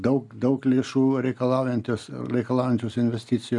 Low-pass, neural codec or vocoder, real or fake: 10.8 kHz; none; real